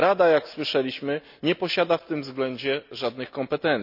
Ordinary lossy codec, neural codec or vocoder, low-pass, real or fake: none; none; 5.4 kHz; real